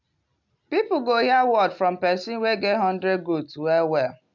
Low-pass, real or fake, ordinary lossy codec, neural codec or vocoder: 7.2 kHz; real; none; none